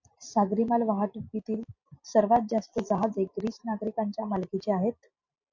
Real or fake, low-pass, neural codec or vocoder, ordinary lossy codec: real; 7.2 kHz; none; MP3, 48 kbps